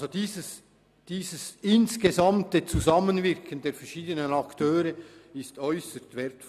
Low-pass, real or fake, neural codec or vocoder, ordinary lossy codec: 14.4 kHz; real; none; none